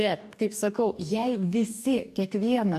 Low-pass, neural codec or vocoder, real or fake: 14.4 kHz; codec, 44.1 kHz, 2.6 kbps, DAC; fake